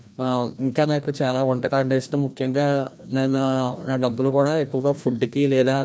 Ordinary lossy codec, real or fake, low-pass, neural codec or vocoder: none; fake; none; codec, 16 kHz, 1 kbps, FreqCodec, larger model